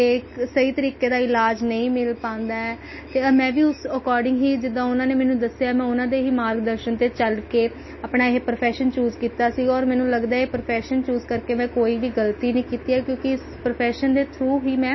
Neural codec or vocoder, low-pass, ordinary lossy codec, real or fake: none; 7.2 kHz; MP3, 24 kbps; real